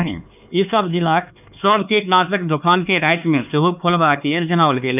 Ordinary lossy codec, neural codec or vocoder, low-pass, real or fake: none; codec, 16 kHz, 4 kbps, X-Codec, HuBERT features, trained on LibriSpeech; 3.6 kHz; fake